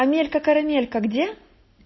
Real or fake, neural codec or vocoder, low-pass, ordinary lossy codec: real; none; 7.2 kHz; MP3, 24 kbps